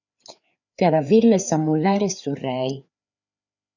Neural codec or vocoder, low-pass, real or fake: codec, 16 kHz, 4 kbps, FreqCodec, larger model; 7.2 kHz; fake